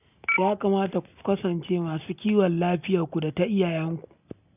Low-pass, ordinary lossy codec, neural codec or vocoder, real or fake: 3.6 kHz; none; none; real